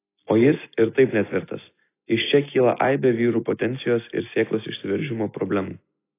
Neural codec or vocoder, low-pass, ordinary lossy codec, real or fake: none; 3.6 kHz; AAC, 24 kbps; real